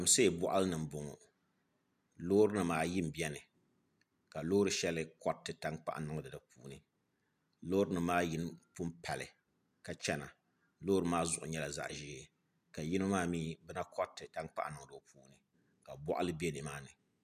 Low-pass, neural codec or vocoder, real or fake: 14.4 kHz; none; real